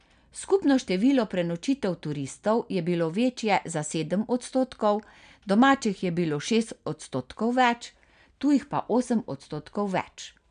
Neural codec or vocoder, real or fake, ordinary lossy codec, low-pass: none; real; none; 9.9 kHz